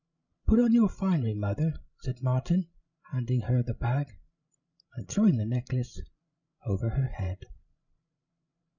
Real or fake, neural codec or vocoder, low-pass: fake; codec, 16 kHz, 16 kbps, FreqCodec, larger model; 7.2 kHz